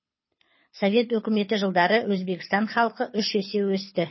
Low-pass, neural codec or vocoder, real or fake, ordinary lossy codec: 7.2 kHz; codec, 24 kHz, 6 kbps, HILCodec; fake; MP3, 24 kbps